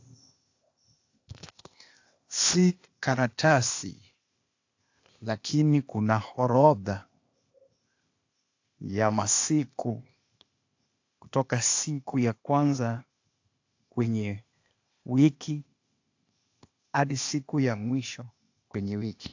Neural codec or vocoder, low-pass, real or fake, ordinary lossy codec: codec, 16 kHz, 0.8 kbps, ZipCodec; 7.2 kHz; fake; AAC, 48 kbps